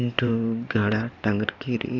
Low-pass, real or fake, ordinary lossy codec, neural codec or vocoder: 7.2 kHz; fake; none; codec, 16 kHz in and 24 kHz out, 2.2 kbps, FireRedTTS-2 codec